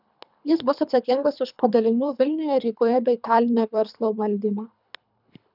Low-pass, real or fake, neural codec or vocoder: 5.4 kHz; fake; codec, 24 kHz, 3 kbps, HILCodec